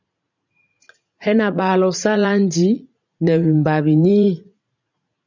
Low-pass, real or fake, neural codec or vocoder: 7.2 kHz; fake; vocoder, 44.1 kHz, 80 mel bands, Vocos